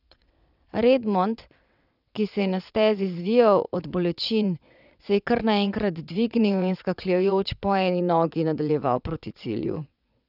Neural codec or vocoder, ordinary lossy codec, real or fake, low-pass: vocoder, 22.05 kHz, 80 mel bands, WaveNeXt; none; fake; 5.4 kHz